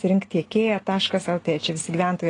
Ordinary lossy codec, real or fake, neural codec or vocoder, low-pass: AAC, 32 kbps; real; none; 9.9 kHz